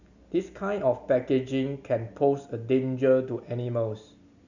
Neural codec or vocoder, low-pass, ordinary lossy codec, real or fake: none; 7.2 kHz; none; real